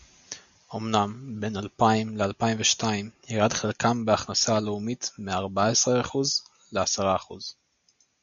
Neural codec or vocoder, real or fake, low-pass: none; real; 7.2 kHz